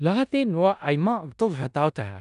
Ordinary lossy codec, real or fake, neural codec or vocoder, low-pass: none; fake; codec, 16 kHz in and 24 kHz out, 0.4 kbps, LongCat-Audio-Codec, four codebook decoder; 10.8 kHz